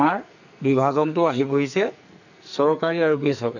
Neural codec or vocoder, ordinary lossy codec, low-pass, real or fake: codec, 44.1 kHz, 2.6 kbps, SNAC; none; 7.2 kHz; fake